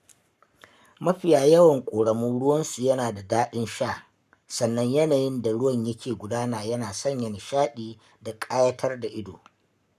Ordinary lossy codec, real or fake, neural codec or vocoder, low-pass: none; fake; codec, 44.1 kHz, 7.8 kbps, Pupu-Codec; 14.4 kHz